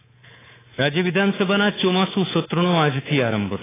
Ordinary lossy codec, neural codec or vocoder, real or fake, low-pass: AAC, 16 kbps; codec, 24 kHz, 3.1 kbps, DualCodec; fake; 3.6 kHz